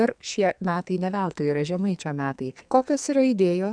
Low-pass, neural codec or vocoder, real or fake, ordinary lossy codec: 9.9 kHz; codec, 44.1 kHz, 2.6 kbps, SNAC; fake; MP3, 96 kbps